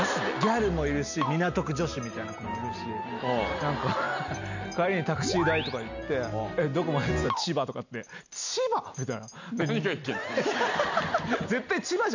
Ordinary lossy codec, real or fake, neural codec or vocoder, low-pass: none; real; none; 7.2 kHz